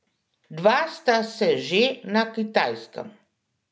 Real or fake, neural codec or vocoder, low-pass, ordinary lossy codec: real; none; none; none